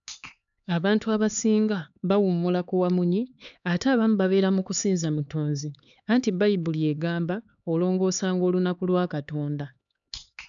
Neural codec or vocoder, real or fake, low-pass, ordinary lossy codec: codec, 16 kHz, 4 kbps, X-Codec, HuBERT features, trained on LibriSpeech; fake; 7.2 kHz; none